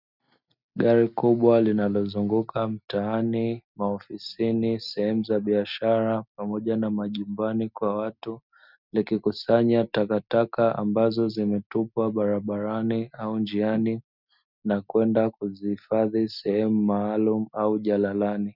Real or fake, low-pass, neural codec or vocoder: real; 5.4 kHz; none